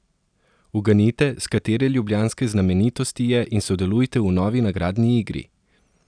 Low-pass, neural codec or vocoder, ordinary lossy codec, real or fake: 9.9 kHz; none; none; real